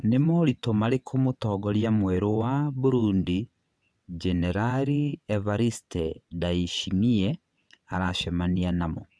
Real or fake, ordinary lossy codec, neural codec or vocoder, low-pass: fake; none; vocoder, 22.05 kHz, 80 mel bands, WaveNeXt; none